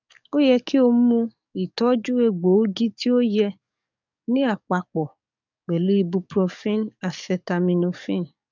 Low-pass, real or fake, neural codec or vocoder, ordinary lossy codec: 7.2 kHz; fake; codec, 44.1 kHz, 7.8 kbps, DAC; none